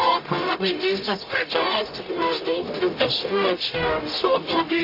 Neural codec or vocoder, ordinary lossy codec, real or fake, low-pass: codec, 44.1 kHz, 0.9 kbps, DAC; AAC, 32 kbps; fake; 5.4 kHz